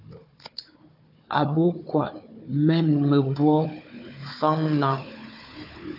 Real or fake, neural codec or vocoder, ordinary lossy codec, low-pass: fake; codec, 16 kHz, 4 kbps, FunCodec, trained on Chinese and English, 50 frames a second; AAC, 48 kbps; 5.4 kHz